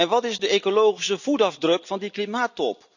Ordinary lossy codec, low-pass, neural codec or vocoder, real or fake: none; 7.2 kHz; none; real